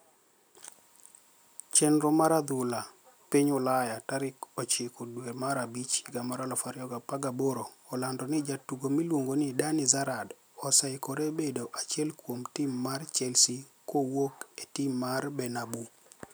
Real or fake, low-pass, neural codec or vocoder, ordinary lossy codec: real; none; none; none